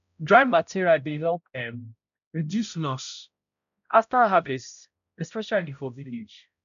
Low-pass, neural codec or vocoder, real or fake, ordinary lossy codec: 7.2 kHz; codec, 16 kHz, 0.5 kbps, X-Codec, HuBERT features, trained on balanced general audio; fake; none